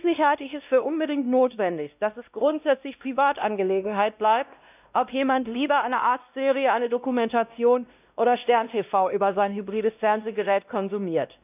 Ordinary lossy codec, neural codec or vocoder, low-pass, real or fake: none; codec, 16 kHz, 1 kbps, X-Codec, WavLM features, trained on Multilingual LibriSpeech; 3.6 kHz; fake